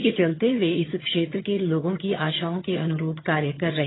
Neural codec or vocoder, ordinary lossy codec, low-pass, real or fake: vocoder, 22.05 kHz, 80 mel bands, HiFi-GAN; AAC, 16 kbps; 7.2 kHz; fake